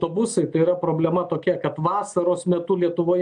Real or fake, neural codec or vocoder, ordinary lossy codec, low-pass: real; none; AAC, 64 kbps; 9.9 kHz